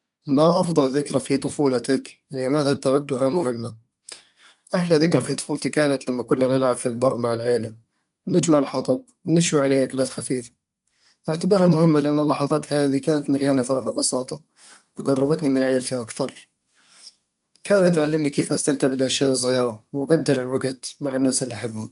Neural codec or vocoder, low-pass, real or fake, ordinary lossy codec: codec, 24 kHz, 1 kbps, SNAC; 10.8 kHz; fake; none